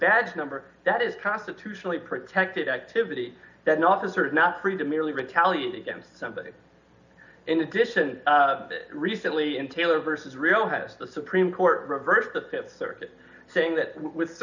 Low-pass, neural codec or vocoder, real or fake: 7.2 kHz; none; real